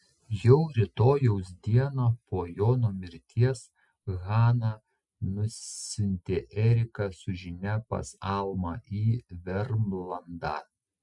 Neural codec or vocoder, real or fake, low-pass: none; real; 10.8 kHz